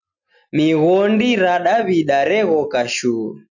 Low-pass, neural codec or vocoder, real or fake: 7.2 kHz; none; real